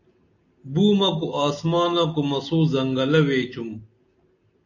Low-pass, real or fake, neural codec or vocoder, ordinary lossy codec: 7.2 kHz; real; none; AAC, 48 kbps